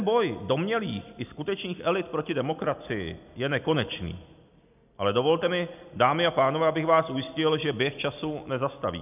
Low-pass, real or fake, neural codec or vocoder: 3.6 kHz; real; none